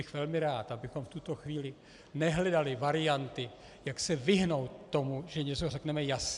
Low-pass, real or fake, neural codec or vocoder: 10.8 kHz; real; none